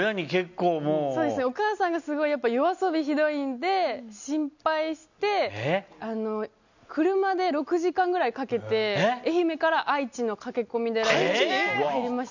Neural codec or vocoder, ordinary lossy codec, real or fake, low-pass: none; none; real; 7.2 kHz